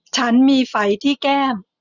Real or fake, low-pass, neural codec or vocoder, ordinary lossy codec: real; 7.2 kHz; none; none